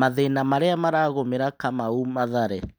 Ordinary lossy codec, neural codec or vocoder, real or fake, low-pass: none; none; real; none